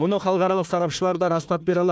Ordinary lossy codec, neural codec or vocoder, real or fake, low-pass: none; codec, 16 kHz, 1 kbps, FunCodec, trained on Chinese and English, 50 frames a second; fake; none